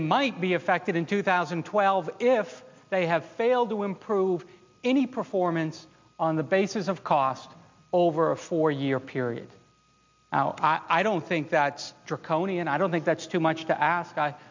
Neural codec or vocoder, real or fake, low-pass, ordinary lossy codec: none; real; 7.2 kHz; MP3, 64 kbps